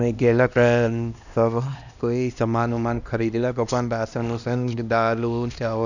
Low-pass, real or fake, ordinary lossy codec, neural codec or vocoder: 7.2 kHz; fake; none; codec, 16 kHz, 1 kbps, X-Codec, HuBERT features, trained on LibriSpeech